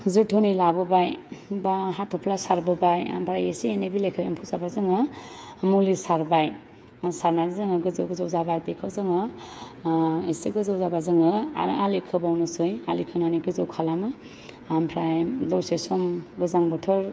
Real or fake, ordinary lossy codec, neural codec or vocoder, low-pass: fake; none; codec, 16 kHz, 8 kbps, FreqCodec, smaller model; none